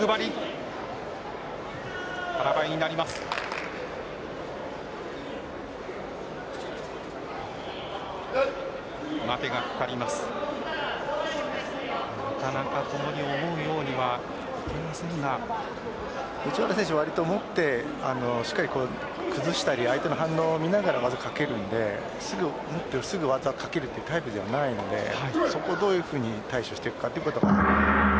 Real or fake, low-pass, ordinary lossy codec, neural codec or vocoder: real; none; none; none